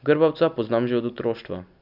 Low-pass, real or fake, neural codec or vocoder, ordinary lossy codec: 5.4 kHz; real; none; none